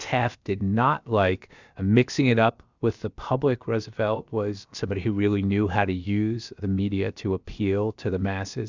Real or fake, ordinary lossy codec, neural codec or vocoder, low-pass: fake; Opus, 64 kbps; codec, 16 kHz, about 1 kbps, DyCAST, with the encoder's durations; 7.2 kHz